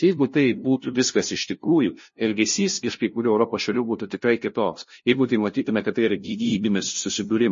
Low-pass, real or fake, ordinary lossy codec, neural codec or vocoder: 7.2 kHz; fake; MP3, 32 kbps; codec, 16 kHz, 0.5 kbps, FunCodec, trained on LibriTTS, 25 frames a second